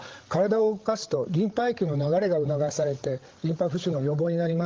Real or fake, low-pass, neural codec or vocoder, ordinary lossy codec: fake; 7.2 kHz; codec, 16 kHz, 16 kbps, FreqCodec, larger model; Opus, 16 kbps